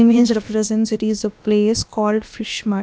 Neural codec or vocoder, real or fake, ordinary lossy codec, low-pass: codec, 16 kHz, about 1 kbps, DyCAST, with the encoder's durations; fake; none; none